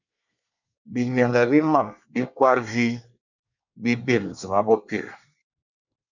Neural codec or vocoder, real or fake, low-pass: codec, 24 kHz, 1 kbps, SNAC; fake; 7.2 kHz